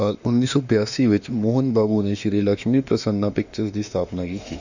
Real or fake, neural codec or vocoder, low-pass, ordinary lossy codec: fake; autoencoder, 48 kHz, 32 numbers a frame, DAC-VAE, trained on Japanese speech; 7.2 kHz; none